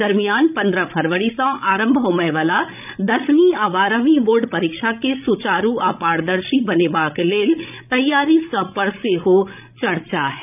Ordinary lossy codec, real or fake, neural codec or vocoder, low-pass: none; fake; codec, 16 kHz, 16 kbps, FreqCodec, larger model; 3.6 kHz